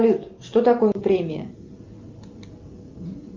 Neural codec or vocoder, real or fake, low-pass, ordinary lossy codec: none; real; 7.2 kHz; Opus, 24 kbps